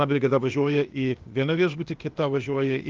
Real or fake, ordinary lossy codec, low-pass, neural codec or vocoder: fake; Opus, 32 kbps; 7.2 kHz; codec, 16 kHz, 0.8 kbps, ZipCodec